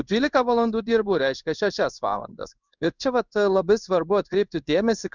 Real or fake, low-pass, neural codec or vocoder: fake; 7.2 kHz; codec, 16 kHz in and 24 kHz out, 1 kbps, XY-Tokenizer